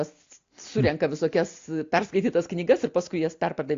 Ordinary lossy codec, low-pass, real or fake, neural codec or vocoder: AAC, 48 kbps; 7.2 kHz; real; none